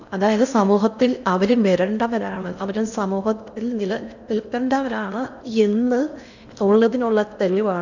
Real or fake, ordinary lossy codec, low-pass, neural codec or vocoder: fake; none; 7.2 kHz; codec, 16 kHz in and 24 kHz out, 0.6 kbps, FocalCodec, streaming, 4096 codes